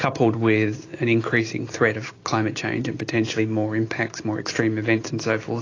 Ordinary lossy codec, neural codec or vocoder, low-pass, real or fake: AAC, 32 kbps; none; 7.2 kHz; real